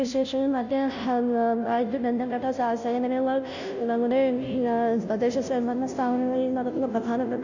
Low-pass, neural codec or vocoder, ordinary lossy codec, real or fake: 7.2 kHz; codec, 16 kHz, 0.5 kbps, FunCodec, trained on Chinese and English, 25 frames a second; none; fake